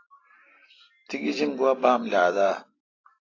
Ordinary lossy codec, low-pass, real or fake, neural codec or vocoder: AAC, 32 kbps; 7.2 kHz; real; none